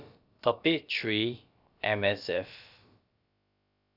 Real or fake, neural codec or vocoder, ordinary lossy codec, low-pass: fake; codec, 16 kHz, about 1 kbps, DyCAST, with the encoder's durations; Opus, 64 kbps; 5.4 kHz